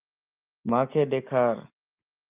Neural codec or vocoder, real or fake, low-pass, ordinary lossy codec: none; real; 3.6 kHz; Opus, 24 kbps